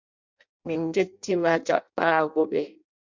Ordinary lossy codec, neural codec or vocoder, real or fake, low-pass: MP3, 64 kbps; codec, 16 kHz in and 24 kHz out, 0.6 kbps, FireRedTTS-2 codec; fake; 7.2 kHz